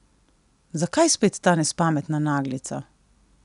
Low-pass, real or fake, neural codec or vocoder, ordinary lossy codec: 10.8 kHz; real; none; none